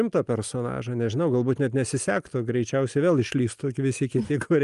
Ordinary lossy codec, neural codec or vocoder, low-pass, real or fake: Opus, 32 kbps; none; 10.8 kHz; real